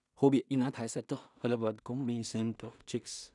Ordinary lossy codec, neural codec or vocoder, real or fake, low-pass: none; codec, 16 kHz in and 24 kHz out, 0.4 kbps, LongCat-Audio-Codec, two codebook decoder; fake; 10.8 kHz